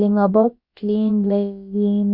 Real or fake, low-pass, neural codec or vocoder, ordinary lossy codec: fake; 5.4 kHz; codec, 16 kHz, about 1 kbps, DyCAST, with the encoder's durations; Opus, 64 kbps